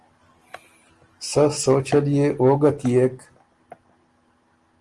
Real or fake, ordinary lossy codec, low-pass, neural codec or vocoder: real; Opus, 24 kbps; 10.8 kHz; none